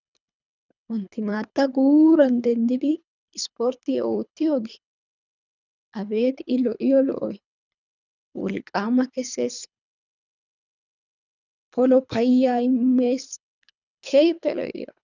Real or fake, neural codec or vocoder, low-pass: fake; codec, 24 kHz, 3 kbps, HILCodec; 7.2 kHz